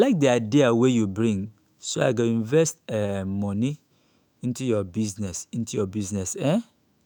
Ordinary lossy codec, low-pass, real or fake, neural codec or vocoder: none; none; fake; autoencoder, 48 kHz, 128 numbers a frame, DAC-VAE, trained on Japanese speech